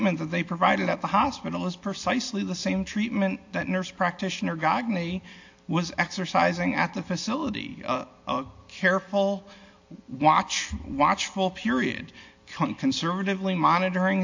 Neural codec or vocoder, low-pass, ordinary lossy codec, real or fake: none; 7.2 kHz; AAC, 48 kbps; real